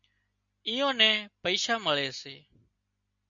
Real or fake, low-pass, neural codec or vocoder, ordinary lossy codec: real; 7.2 kHz; none; MP3, 96 kbps